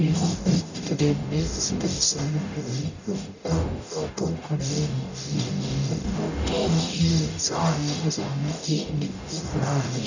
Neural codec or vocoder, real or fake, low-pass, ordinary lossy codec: codec, 44.1 kHz, 0.9 kbps, DAC; fake; 7.2 kHz; none